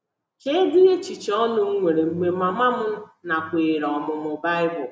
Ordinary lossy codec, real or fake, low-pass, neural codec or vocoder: none; real; none; none